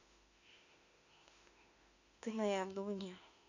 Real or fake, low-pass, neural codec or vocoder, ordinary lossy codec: fake; 7.2 kHz; autoencoder, 48 kHz, 32 numbers a frame, DAC-VAE, trained on Japanese speech; none